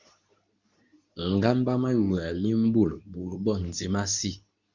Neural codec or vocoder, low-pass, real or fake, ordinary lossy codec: codec, 24 kHz, 0.9 kbps, WavTokenizer, medium speech release version 2; 7.2 kHz; fake; Opus, 64 kbps